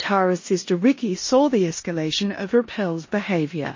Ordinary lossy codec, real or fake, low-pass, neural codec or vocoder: MP3, 32 kbps; fake; 7.2 kHz; codec, 16 kHz in and 24 kHz out, 0.9 kbps, LongCat-Audio-Codec, four codebook decoder